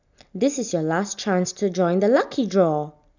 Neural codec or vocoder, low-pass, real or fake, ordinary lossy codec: none; 7.2 kHz; real; none